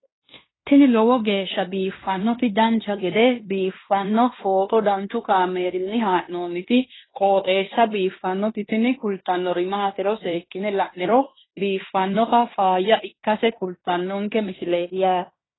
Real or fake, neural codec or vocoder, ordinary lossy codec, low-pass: fake; codec, 16 kHz in and 24 kHz out, 0.9 kbps, LongCat-Audio-Codec, fine tuned four codebook decoder; AAC, 16 kbps; 7.2 kHz